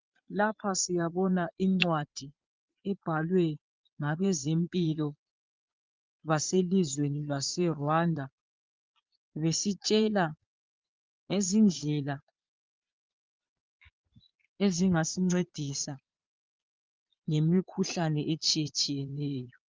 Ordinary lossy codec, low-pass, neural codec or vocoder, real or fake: Opus, 24 kbps; 7.2 kHz; vocoder, 22.05 kHz, 80 mel bands, Vocos; fake